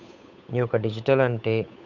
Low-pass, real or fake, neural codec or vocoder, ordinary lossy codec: 7.2 kHz; fake; codec, 16 kHz, 8 kbps, FunCodec, trained on Chinese and English, 25 frames a second; none